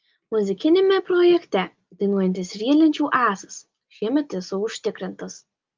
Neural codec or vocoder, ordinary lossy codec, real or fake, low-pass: none; Opus, 32 kbps; real; 7.2 kHz